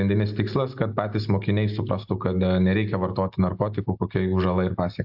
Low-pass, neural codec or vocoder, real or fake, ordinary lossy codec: 5.4 kHz; none; real; AAC, 48 kbps